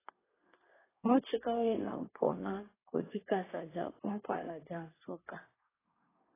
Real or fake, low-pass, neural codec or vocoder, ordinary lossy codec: fake; 3.6 kHz; codec, 24 kHz, 1 kbps, SNAC; AAC, 16 kbps